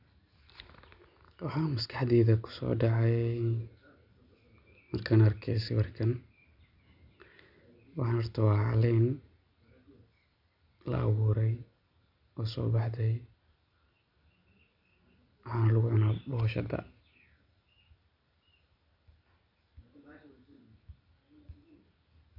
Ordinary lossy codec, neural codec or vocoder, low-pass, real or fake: none; none; 5.4 kHz; real